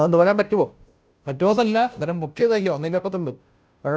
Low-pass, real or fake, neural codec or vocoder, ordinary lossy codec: none; fake; codec, 16 kHz, 0.5 kbps, FunCodec, trained on Chinese and English, 25 frames a second; none